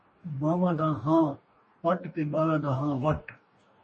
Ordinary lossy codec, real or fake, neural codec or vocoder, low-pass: MP3, 32 kbps; fake; codec, 44.1 kHz, 2.6 kbps, DAC; 10.8 kHz